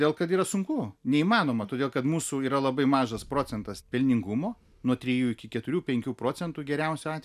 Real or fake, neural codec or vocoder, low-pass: real; none; 14.4 kHz